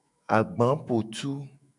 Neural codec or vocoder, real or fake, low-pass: autoencoder, 48 kHz, 128 numbers a frame, DAC-VAE, trained on Japanese speech; fake; 10.8 kHz